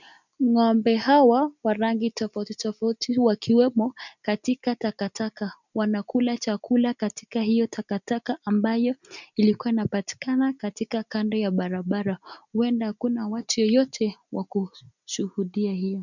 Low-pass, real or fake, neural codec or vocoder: 7.2 kHz; real; none